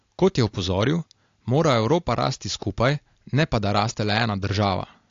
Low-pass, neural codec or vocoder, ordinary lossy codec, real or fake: 7.2 kHz; none; AAC, 48 kbps; real